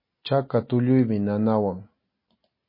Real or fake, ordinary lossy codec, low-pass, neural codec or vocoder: real; MP3, 24 kbps; 5.4 kHz; none